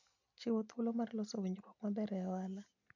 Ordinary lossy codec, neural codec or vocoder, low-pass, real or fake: MP3, 64 kbps; none; 7.2 kHz; real